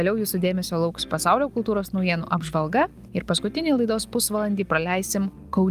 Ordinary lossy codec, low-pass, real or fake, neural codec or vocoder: Opus, 32 kbps; 14.4 kHz; real; none